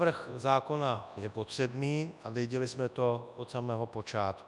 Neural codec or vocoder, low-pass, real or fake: codec, 24 kHz, 0.9 kbps, WavTokenizer, large speech release; 10.8 kHz; fake